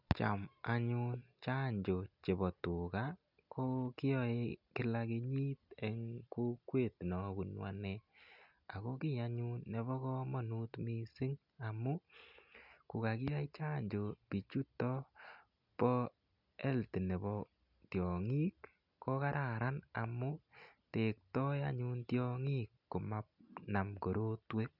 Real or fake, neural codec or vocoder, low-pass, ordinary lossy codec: real; none; 5.4 kHz; none